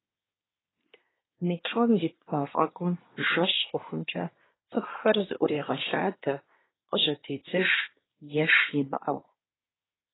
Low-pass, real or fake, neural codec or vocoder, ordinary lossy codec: 7.2 kHz; fake; codec, 24 kHz, 1 kbps, SNAC; AAC, 16 kbps